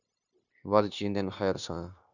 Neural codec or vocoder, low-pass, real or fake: codec, 16 kHz, 0.9 kbps, LongCat-Audio-Codec; 7.2 kHz; fake